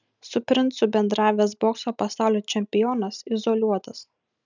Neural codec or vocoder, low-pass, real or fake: none; 7.2 kHz; real